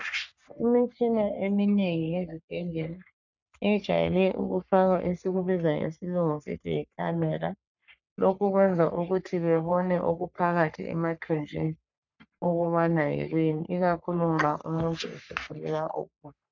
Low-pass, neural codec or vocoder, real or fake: 7.2 kHz; codec, 44.1 kHz, 3.4 kbps, Pupu-Codec; fake